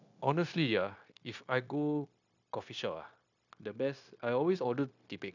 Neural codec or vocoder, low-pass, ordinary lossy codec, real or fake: codec, 16 kHz, 0.9 kbps, LongCat-Audio-Codec; 7.2 kHz; none; fake